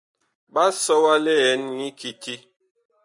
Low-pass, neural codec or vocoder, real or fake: 10.8 kHz; none; real